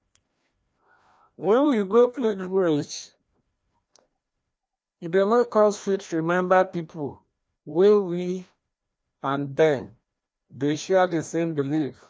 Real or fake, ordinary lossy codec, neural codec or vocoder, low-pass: fake; none; codec, 16 kHz, 1 kbps, FreqCodec, larger model; none